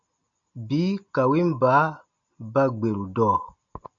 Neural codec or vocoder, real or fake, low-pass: none; real; 7.2 kHz